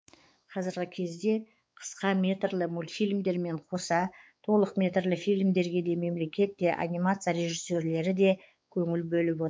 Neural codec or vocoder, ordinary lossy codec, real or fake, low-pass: codec, 16 kHz, 4 kbps, X-Codec, WavLM features, trained on Multilingual LibriSpeech; none; fake; none